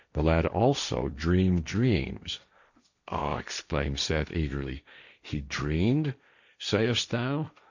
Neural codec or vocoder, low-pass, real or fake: codec, 16 kHz, 1.1 kbps, Voila-Tokenizer; 7.2 kHz; fake